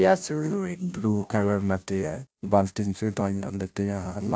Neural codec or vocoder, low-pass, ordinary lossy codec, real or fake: codec, 16 kHz, 0.5 kbps, FunCodec, trained on Chinese and English, 25 frames a second; none; none; fake